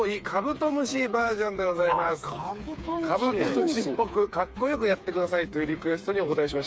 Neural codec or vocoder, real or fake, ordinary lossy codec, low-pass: codec, 16 kHz, 4 kbps, FreqCodec, smaller model; fake; none; none